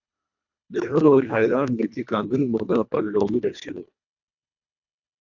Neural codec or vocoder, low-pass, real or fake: codec, 24 kHz, 1.5 kbps, HILCodec; 7.2 kHz; fake